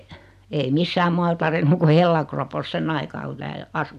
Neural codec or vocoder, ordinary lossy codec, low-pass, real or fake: none; none; 14.4 kHz; real